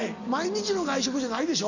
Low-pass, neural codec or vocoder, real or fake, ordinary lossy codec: 7.2 kHz; none; real; none